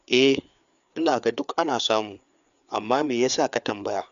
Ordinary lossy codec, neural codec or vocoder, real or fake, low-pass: none; codec, 16 kHz, 4 kbps, FreqCodec, larger model; fake; 7.2 kHz